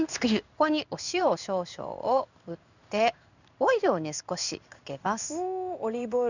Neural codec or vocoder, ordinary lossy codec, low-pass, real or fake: codec, 16 kHz in and 24 kHz out, 1 kbps, XY-Tokenizer; none; 7.2 kHz; fake